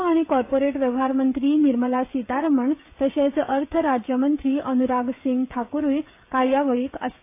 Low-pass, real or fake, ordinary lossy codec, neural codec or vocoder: 3.6 kHz; fake; AAC, 24 kbps; codec, 16 kHz, 16 kbps, FreqCodec, smaller model